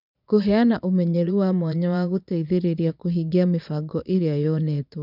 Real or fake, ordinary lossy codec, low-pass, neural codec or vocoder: fake; none; 5.4 kHz; vocoder, 22.05 kHz, 80 mel bands, Vocos